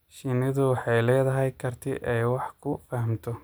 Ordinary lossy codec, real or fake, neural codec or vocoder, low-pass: none; real; none; none